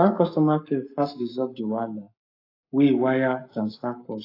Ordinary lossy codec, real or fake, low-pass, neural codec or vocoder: AAC, 32 kbps; fake; 5.4 kHz; codec, 44.1 kHz, 7.8 kbps, Pupu-Codec